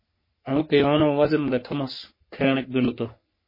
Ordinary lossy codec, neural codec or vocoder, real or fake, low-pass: MP3, 24 kbps; codec, 44.1 kHz, 3.4 kbps, Pupu-Codec; fake; 5.4 kHz